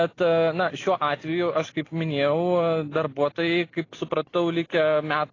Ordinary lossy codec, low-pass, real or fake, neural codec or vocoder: AAC, 32 kbps; 7.2 kHz; real; none